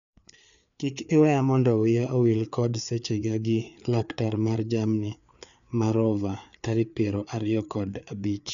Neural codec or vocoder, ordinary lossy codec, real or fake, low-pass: codec, 16 kHz, 4 kbps, FreqCodec, larger model; none; fake; 7.2 kHz